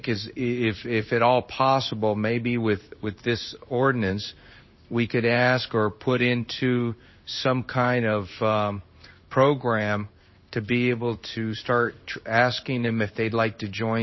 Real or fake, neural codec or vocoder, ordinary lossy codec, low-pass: fake; codec, 16 kHz in and 24 kHz out, 1 kbps, XY-Tokenizer; MP3, 24 kbps; 7.2 kHz